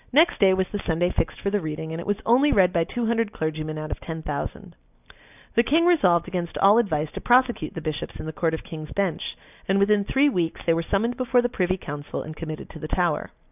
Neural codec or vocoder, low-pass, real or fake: none; 3.6 kHz; real